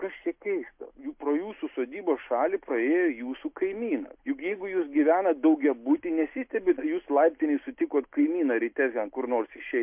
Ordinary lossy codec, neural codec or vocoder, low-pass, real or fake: MP3, 32 kbps; none; 3.6 kHz; real